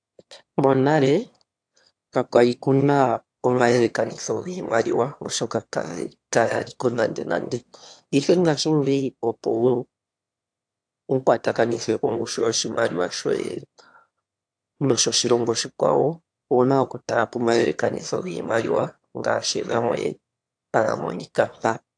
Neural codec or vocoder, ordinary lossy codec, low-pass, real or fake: autoencoder, 22.05 kHz, a latent of 192 numbers a frame, VITS, trained on one speaker; AAC, 64 kbps; 9.9 kHz; fake